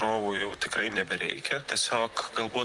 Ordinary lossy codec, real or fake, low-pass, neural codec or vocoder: Opus, 32 kbps; fake; 10.8 kHz; vocoder, 44.1 kHz, 128 mel bands, Pupu-Vocoder